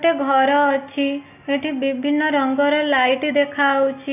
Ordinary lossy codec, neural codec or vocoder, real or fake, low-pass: none; none; real; 3.6 kHz